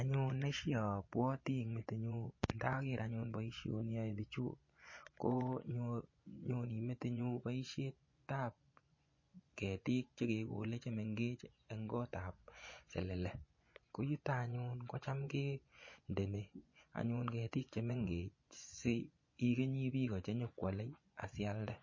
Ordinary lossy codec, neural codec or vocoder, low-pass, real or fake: MP3, 32 kbps; vocoder, 24 kHz, 100 mel bands, Vocos; 7.2 kHz; fake